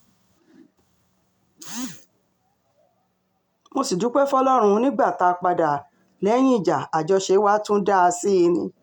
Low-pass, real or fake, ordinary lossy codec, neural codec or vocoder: 19.8 kHz; real; MP3, 96 kbps; none